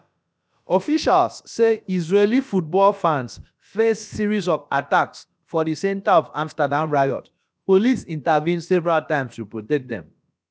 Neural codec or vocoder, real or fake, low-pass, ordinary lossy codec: codec, 16 kHz, about 1 kbps, DyCAST, with the encoder's durations; fake; none; none